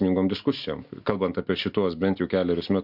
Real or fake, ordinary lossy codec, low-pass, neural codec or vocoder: real; MP3, 48 kbps; 5.4 kHz; none